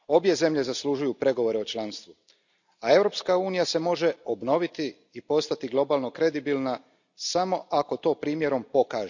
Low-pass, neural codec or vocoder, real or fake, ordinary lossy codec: 7.2 kHz; none; real; none